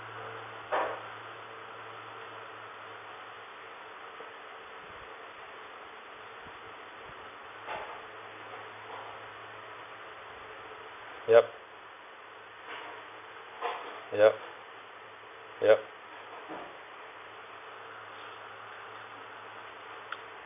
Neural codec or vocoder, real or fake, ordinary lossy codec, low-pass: none; real; none; 3.6 kHz